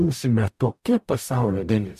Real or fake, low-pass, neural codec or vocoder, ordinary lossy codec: fake; 14.4 kHz; codec, 44.1 kHz, 0.9 kbps, DAC; MP3, 64 kbps